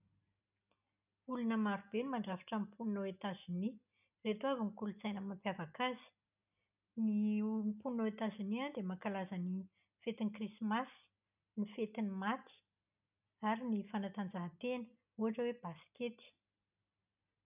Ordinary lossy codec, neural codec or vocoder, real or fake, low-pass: none; none; real; 3.6 kHz